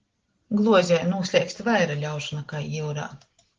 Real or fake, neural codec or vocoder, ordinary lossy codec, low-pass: real; none; Opus, 16 kbps; 7.2 kHz